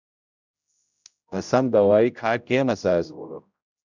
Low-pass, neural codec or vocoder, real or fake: 7.2 kHz; codec, 16 kHz, 0.5 kbps, X-Codec, HuBERT features, trained on general audio; fake